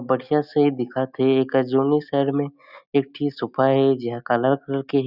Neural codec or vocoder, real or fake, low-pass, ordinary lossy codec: none; real; 5.4 kHz; none